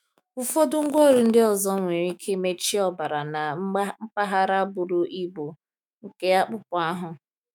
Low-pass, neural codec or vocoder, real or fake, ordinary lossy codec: none; autoencoder, 48 kHz, 128 numbers a frame, DAC-VAE, trained on Japanese speech; fake; none